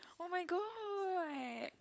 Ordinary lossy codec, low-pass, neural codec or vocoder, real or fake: none; none; codec, 16 kHz, 16 kbps, FunCodec, trained on LibriTTS, 50 frames a second; fake